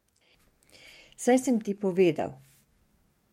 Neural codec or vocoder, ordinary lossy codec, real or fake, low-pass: codec, 44.1 kHz, 7.8 kbps, DAC; MP3, 64 kbps; fake; 19.8 kHz